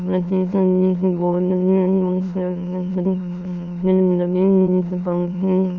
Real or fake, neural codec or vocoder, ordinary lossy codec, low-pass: fake; autoencoder, 22.05 kHz, a latent of 192 numbers a frame, VITS, trained on many speakers; MP3, 64 kbps; 7.2 kHz